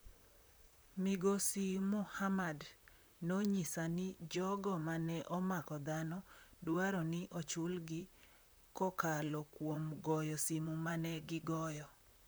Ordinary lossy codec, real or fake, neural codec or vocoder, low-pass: none; fake; vocoder, 44.1 kHz, 128 mel bands, Pupu-Vocoder; none